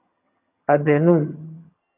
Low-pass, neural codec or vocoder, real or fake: 3.6 kHz; vocoder, 22.05 kHz, 80 mel bands, HiFi-GAN; fake